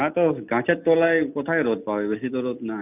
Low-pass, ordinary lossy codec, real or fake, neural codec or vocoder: 3.6 kHz; none; real; none